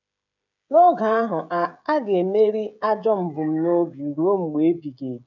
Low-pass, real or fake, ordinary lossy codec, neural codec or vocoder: 7.2 kHz; fake; none; codec, 16 kHz, 16 kbps, FreqCodec, smaller model